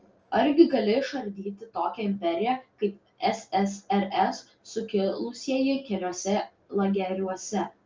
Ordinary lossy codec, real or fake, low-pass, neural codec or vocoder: Opus, 24 kbps; real; 7.2 kHz; none